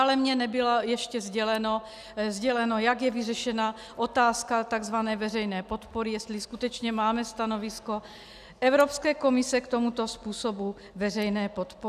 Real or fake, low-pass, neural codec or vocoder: real; 14.4 kHz; none